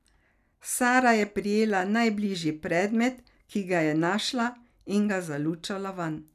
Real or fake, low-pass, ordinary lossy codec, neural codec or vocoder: real; 14.4 kHz; none; none